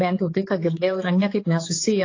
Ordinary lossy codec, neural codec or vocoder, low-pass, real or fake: AAC, 32 kbps; codec, 16 kHz, 4 kbps, X-Codec, HuBERT features, trained on general audio; 7.2 kHz; fake